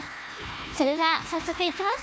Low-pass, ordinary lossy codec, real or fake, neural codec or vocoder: none; none; fake; codec, 16 kHz, 1 kbps, FunCodec, trained on Chinese and English, 50 frames a second